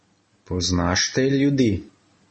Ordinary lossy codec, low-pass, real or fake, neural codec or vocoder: MP3, 32 kbps; 9.9 kHz; real; none